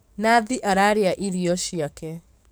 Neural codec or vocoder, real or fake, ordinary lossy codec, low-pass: codec, 44.1 kHz, 7.8 kbps, DAC; fake; none; none